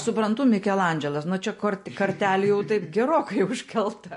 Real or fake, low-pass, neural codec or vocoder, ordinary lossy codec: real; 10.8 kHz; none; MP3, 48 kbps